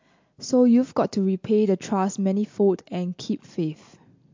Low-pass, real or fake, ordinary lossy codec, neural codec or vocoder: 7.2 kHz; real; MP3, 48 kbps; none